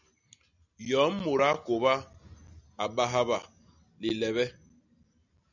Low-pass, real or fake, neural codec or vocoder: 7.2 kHz; real; none